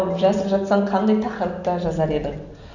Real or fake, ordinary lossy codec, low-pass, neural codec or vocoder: real; none; 7.2 kHz; none